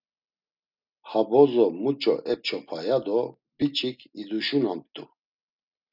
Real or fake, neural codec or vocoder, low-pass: real; none; 5.4 kHz